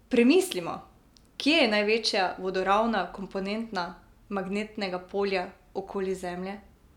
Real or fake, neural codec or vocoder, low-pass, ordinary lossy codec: real; none; 19.8 kHz; none